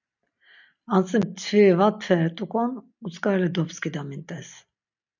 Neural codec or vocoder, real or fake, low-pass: none; real; 7.2 kHz